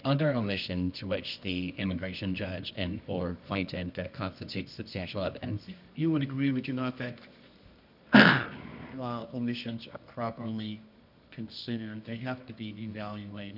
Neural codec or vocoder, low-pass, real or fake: codec, 24 kHz, 0.9 kbps, WavTokenizer, medium music audio release; 5.4 kHz; fake